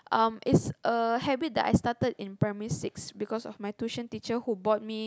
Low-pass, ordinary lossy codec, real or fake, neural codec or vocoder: none; none; real; none